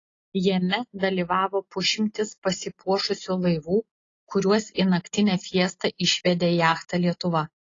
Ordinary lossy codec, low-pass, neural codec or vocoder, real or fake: AAC, 32 kbps; 7.2 kHz; none; real